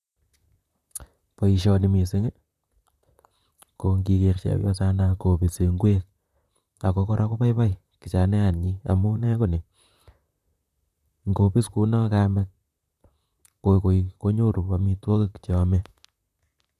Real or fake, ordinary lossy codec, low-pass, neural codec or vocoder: fake; none; 14.4 kHz; vocoder, 44.1 kHz, 128 mel bands, Pupu-Vocoder